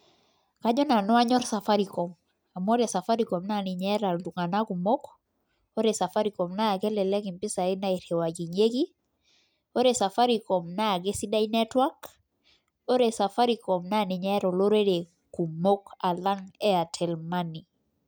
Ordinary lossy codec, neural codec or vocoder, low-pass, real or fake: none; none; none; real